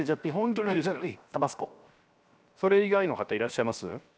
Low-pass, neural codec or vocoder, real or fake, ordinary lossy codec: none; codec, 16 kHz, 0.7 kbps, FocalCodec; fake; none